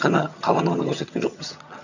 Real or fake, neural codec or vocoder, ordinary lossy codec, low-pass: fake; vocoder, 22.05 kHz, 80 mel bands, HiFi-GAN; none; 7.2 kHz